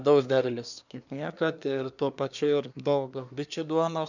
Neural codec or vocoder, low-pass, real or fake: codec, 24 kHz, 1 kbps, SNAC; 7.2 kHz; fake